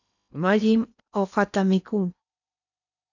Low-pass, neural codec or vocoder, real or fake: 7.2 kHz; codec, 16 kHz in and 24 kHz out, 0.8 kbps, FocalCodec, streaming, 65536 codes; fake